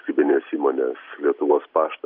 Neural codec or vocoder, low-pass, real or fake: none; 5.4 kHz; real